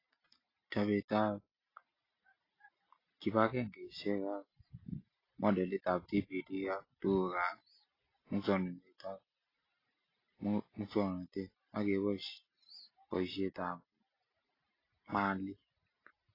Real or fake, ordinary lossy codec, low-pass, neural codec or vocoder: real; AAC, 24 kbps; 5.4 kHz; none